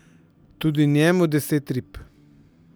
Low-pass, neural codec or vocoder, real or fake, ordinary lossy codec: none; none; real; none